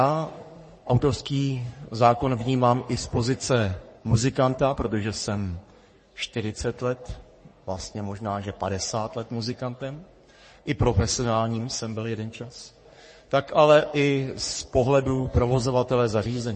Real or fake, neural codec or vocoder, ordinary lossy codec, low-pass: fake; codec, 44.1 kHz, 3.4 kbps, Pupu-Codec; MP3, 32 kbps; 10.8 kHz